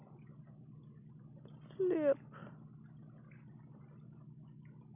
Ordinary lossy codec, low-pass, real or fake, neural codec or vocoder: none; 3.6 kHz; real; none